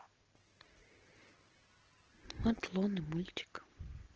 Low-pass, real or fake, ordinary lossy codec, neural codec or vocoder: 7.2 kHz; real; Opus, 16 kbps; none